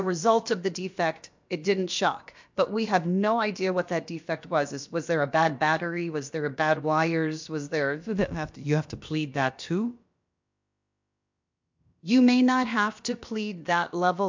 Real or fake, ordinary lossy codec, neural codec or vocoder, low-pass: fake; MP3, 64 kbps; codec, 16 kHz, about 1 kbps, DyCAST, with the encoder's durations; 7.2 kHz